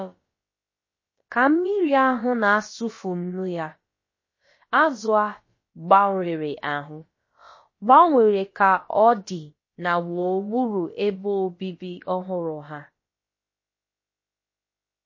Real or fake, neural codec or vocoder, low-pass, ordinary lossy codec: fake; codec, 16 kHz, about 1 kbps, DyCAST, with the encoder's durations; 7.2 kHz; MP3, 32 kbps